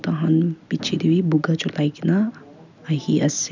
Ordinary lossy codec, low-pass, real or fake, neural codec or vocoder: none; 7.2 kHz; real; none